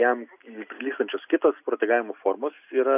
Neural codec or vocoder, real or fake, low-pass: none; real; 3.6 kHz